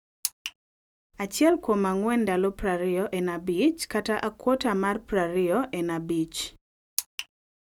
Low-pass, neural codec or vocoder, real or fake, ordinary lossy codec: 19.8 kHz; none; real; none